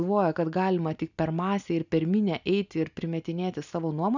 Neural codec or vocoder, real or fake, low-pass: none; real; 7.2 kHz